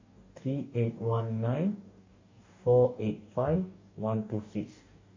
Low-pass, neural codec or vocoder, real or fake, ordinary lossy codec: 7.2 kHz; codec, 44.1 kHz, 2.6 kbps, DAC; fake; MP3, 48 kbps